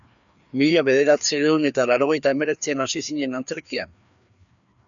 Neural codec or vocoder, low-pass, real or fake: codec, 16 kHz, 2 kbps, FreqCodec, larger model; 7.2 kHz; fake